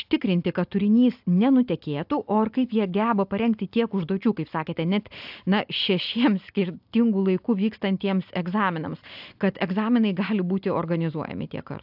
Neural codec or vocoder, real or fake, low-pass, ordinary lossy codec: none; real; 5.4 kHz; AAC, 48 kbps